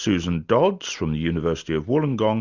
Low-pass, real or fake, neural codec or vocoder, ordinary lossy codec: 7.2 kHz; real; none; Opus, 64 kbps